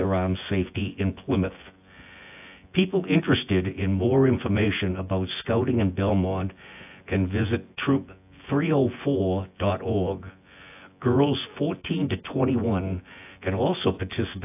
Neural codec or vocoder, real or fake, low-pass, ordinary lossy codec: vocoder, 24 kHz, 100 mel bands, Vocos; fake; 3.6 kHz; AAC, 32 kbps